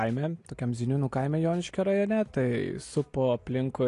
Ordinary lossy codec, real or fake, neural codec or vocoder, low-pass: AAC, 48 kbps; real; none; 10.8 kHz